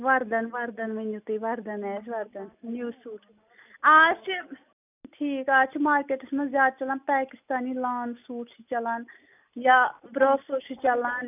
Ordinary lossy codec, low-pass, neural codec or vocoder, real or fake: none; 3.6 kHz; none; real